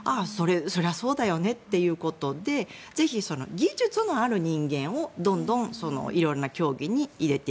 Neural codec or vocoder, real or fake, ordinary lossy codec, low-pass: none; real; none; none